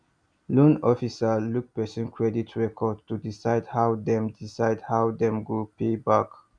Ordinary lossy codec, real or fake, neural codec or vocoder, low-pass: none; real; none; 9.9 kHz